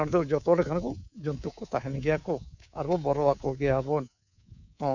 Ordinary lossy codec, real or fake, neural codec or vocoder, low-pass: none; fake; codec, 24 kHz, 3.1 kbps, DualCodec; 7.2 kHz